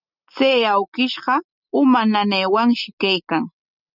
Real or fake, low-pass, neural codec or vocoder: real; 5.4 kHz; none